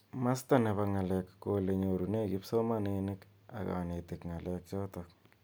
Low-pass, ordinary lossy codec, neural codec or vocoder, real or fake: none; none; none; real